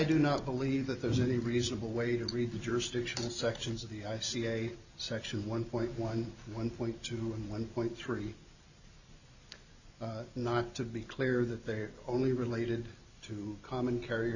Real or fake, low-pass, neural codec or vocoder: real; 7.2 kHz; none